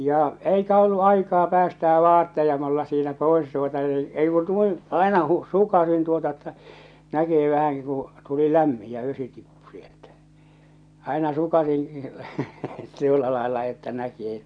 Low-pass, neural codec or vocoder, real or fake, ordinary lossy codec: 9.9 kHz; none; real; none